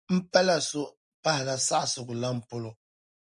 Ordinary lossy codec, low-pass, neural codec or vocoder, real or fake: MP3, 96 kbps; 10.8 kHz; none; real